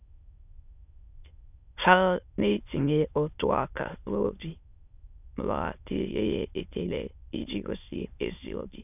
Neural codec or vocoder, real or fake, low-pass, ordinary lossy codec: autoencoder, 22.05 kHz, a latent of 192 numbers a frame, VITS, trained on many speakers; fake; 3.6 kHz; none